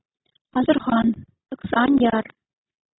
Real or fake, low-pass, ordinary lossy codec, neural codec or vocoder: real; 7.2 kHz; AAC, 16 kbps; none